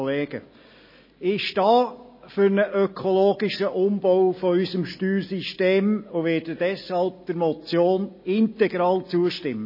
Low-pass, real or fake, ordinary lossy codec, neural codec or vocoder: 5.4 kHz; real; MP3, 24 kbps; none